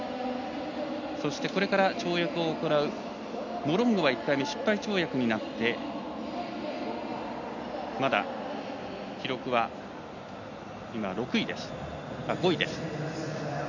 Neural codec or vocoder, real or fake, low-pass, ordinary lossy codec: none; real; 7.2 kHz; none